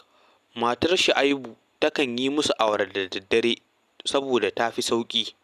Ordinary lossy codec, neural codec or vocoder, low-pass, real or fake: none; none; 14.4 kHz; real